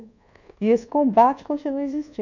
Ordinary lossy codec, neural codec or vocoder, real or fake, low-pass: none; codec, 24 kHz, 1.2 kbps, DualCodec; fake; 7.2 kHz